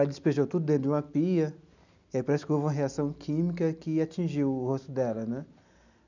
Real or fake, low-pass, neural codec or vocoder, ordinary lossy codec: real; 7.2 kHz; none; none